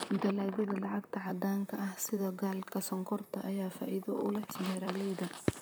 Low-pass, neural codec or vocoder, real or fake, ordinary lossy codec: none; vocoder, 44.1 kHz, 128 mel bands, Pupu-Vocoder; fake; none